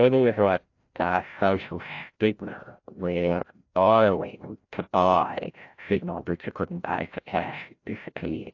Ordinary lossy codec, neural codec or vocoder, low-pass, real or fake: AAC, 48 kbps; codec, 16 kHz, 0.5 kbps, FreqCodec, larger model; 7.2 kHz; fake